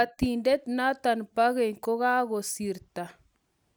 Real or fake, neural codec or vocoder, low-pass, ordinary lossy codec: real; none; none; none